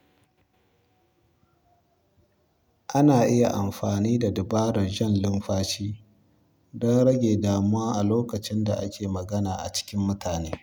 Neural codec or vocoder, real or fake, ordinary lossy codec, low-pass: none; real; none; none